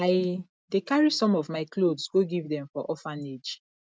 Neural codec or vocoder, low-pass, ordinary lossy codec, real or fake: none; none; none; real